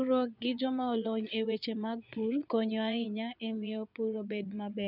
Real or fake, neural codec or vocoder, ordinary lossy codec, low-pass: fake; vocoder, 22.05 kHz, 80 mel bands, Vocos; none; 5.4 kHz